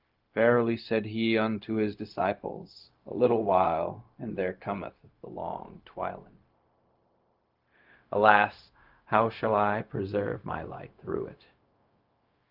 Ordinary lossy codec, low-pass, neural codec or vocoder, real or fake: Opus, 24 kbps; 5.4 kHz; codec, 16 kHz, 0.4 kbps, LongCat-Audio-Codec; fake